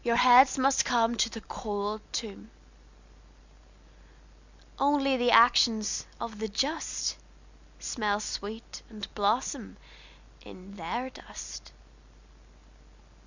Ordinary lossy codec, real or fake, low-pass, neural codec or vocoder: Opus, 64 kbps; real; 7.2 kHz; none